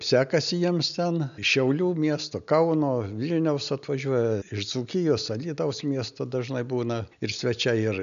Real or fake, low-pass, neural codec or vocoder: real; 7.2 kHz; none